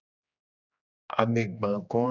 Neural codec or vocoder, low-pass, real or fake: codec, 16 kHz, 2 kbps, X-Codec, HuBERT features, trained on general audio; 7.2 kHz; fake